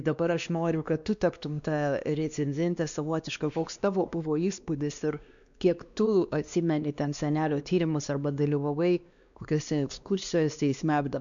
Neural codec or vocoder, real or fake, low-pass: codec, 16 kHz, 2 kbps, X-Codec, WavLM features, trained on Multilingual LibriSpeech; fake; 7.2 kHz